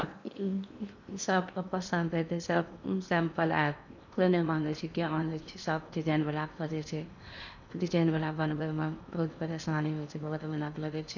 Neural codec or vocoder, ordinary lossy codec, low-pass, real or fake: codec, 16 kHz in and 24 kHz out, 0.8 kbps, FocalCodec, streaming, 65536 codes; none; 7.2 kHz; fake